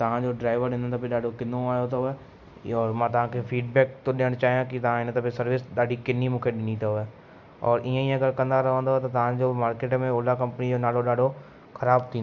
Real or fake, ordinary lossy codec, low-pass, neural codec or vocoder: real; none; 7.2 kHz; none